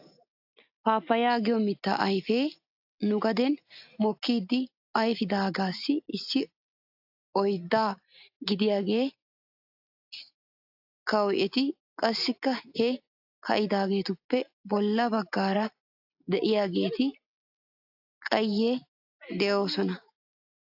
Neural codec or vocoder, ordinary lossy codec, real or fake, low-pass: none; AAC, 48 kbps; real; 5.4 kHz